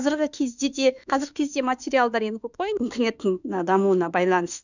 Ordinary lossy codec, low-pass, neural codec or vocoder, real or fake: none; 7.2 kHz; autoencoder, 48 kHz, 32 numbers a frame, DAC-VAE, trained on Japanese speech; fake